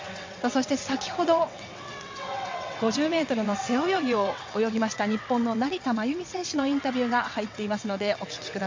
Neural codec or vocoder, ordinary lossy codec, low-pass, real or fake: vocoder, 22.05 kHz, 80 mel bands, WaveNeXt; MP3, 48 kbps; 7.2 kHz; fake